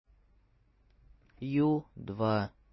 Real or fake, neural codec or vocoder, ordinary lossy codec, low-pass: real; none; MP3, 24 kbps; 7.2 kHz